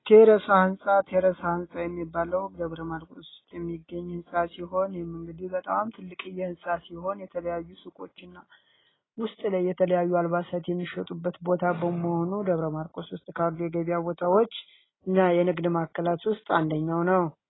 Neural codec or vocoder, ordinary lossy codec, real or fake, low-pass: none; AAC, 16 kbps; real; 7.2 kHz